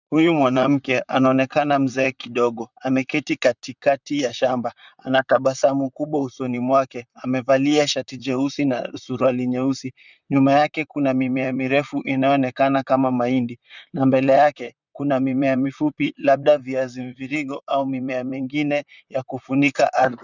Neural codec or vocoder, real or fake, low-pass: vocoder, 44.1 kHz, 128 mel bands, Pupu-Vocoder; fake; 7.2 kHz